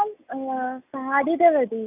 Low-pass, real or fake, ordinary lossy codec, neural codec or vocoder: 3.6 kHz; real; none; none